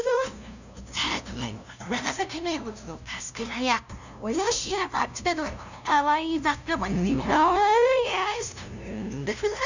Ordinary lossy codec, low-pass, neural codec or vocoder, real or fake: none; 7.2 kHz; codec, 16 kHz, 0.5 kbps, FunCodec, trained on LibriTTS, 25 frames a second; fake